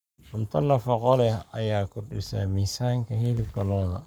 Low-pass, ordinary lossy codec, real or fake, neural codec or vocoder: none; none; fake; codec, 44.1 kHz, 7.8 kbps, Pupu-Codec